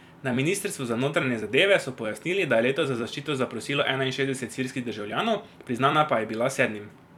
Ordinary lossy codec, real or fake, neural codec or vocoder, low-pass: none; fake; vocoder, 44.1 kHz, 128 mel bands every 256 samples, BigVGAN v2; 19.8 kHz